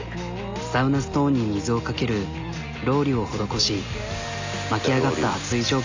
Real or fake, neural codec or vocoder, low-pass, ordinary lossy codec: real; none; 7.2 kHz; AAC, 48 kbps